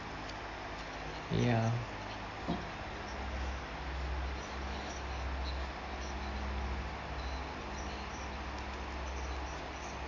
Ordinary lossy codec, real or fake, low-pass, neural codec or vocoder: none; real; 7.2 kHz; none